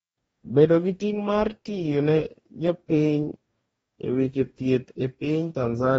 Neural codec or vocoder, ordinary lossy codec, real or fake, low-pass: codec, 44.1 kHz, 2.6 kbps, DAC; AAC, 24 kbps; fake; 19.8 kHz